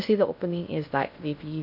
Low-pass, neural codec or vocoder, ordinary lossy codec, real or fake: 5.4 kHz; codec, 16 kHz in and 24 kHz out, 0.6 kbps, FocalCodec, streaming, 2048 codes; none; fake